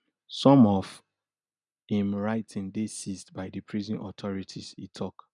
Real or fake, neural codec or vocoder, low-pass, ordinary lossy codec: real; none; 10.8 kHz; none